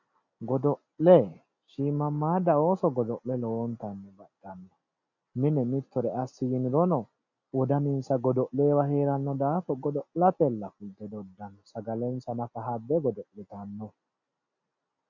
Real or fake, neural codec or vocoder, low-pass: real; none; 7.2 kHz